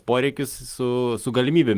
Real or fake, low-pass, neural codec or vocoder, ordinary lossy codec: real; 14.4 kHz; none; Opus, 24 kbps